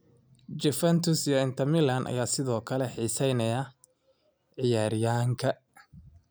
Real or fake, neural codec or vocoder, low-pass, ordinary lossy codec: real; none; none; none